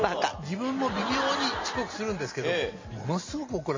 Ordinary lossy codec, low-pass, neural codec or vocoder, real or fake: MP3, 32 kbps; 7.2 kHz; none; real